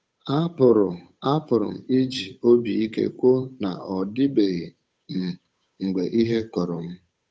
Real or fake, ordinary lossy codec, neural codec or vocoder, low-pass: fake; none; codec, 16 kHz, 8 kbps, FunCodec, trained on Chinese and English, 25 frames a second; none